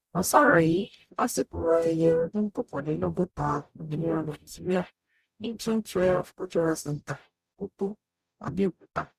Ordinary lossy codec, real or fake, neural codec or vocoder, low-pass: Opus, 64 kbps; fake; codec, 44.1 kHz, 0.9 kbps, DAC; 14.4 kHz